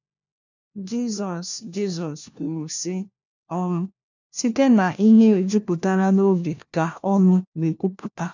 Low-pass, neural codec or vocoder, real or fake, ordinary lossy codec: 7.2 kHz; codec, 16 kHz, 1 kbps, FunCodec, trained on LibriTTS, 50 frames a second; fake; none